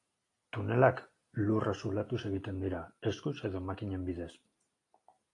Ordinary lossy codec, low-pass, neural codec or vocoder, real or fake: AAC, 48 kbps; 10.8 kHz; none; real